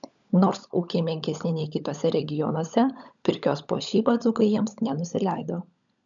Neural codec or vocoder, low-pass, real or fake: codec, 16 kHz, 16 kbps, FunCodec, trained on LibriTTS, 50 frames a second; 7.2 kHz; fake